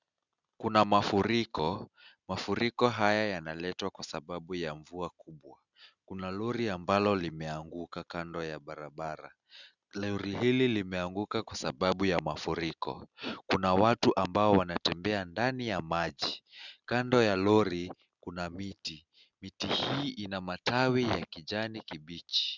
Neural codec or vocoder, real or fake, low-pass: none; real; 7.2 kHz